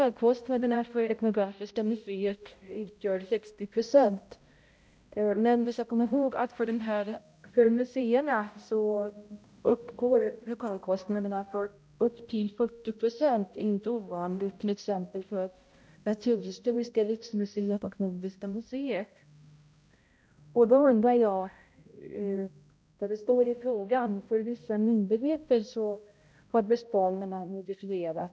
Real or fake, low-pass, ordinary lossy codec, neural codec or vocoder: fake; none; none; codec, 16 kHz, 0.5 kbps, X-Codec, HuBERT features, trained on balanced general audio